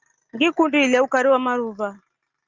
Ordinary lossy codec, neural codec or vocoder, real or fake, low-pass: Opus, 24 kbps; none; real; 7.2 kHz